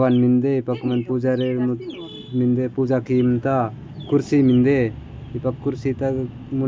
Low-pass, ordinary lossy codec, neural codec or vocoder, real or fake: none; none; none; real